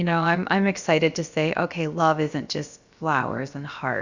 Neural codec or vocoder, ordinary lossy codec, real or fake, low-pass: codec, 16 kHz, about 1 kbps, DyCAST, with the encoder's durations; Opus, 64 kbps; fake; 7.2 kHz